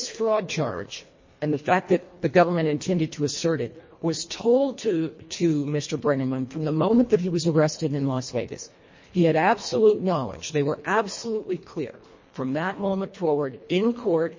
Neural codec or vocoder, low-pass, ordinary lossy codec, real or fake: codec, 24 kHz, 1.5 kbps, HILCodec; 7.2 kHz; MP3, 32 kbps; fake